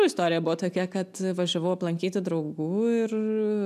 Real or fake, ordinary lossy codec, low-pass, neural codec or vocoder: real; AAC, 96 kbps; 14.4 kHz; none